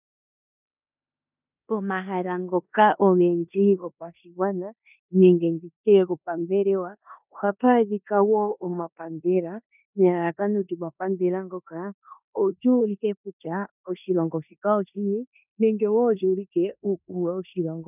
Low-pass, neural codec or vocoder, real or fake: 3.6 kHz; codec, 16 kHz in and 24 kHz out, 0.9 kbps, LongCat-Audio-Codec, four codebook decoder; fake